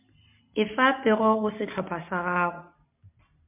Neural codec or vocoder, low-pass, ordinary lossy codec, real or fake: none; 3.6 kHz; MP3, 32 kbps; real